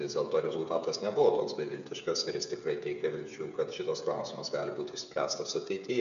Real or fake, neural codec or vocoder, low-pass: fake; codec, 16 kHz, 8 kbps, FreqCodec, smaller model; 7.2 kHz